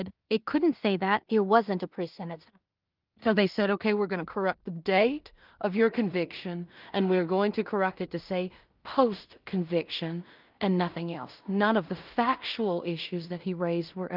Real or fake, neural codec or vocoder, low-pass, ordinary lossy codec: fake; codec, 16 kHz in and 24 kHz out, 0.4 kbps, LongCat-Audio-Codec, two codebook decoder; 5.4 kHz; Opus, 32 kbps